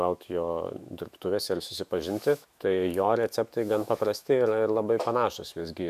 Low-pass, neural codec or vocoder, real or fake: 14.4 kHz; autoencoder, 48 kHz, 128 numbers a frame, DAC-VAE, trained on Japanese speech; fake